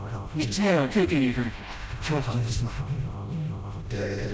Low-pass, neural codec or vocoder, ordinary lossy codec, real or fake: none; codec, 16 kHz, 0.5 kbps, FreqCodec, smaller model; none; fake